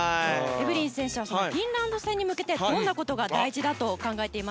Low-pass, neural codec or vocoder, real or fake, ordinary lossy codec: none; none; real; none